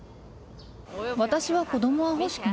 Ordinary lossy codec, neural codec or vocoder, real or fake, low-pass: none; none; real; none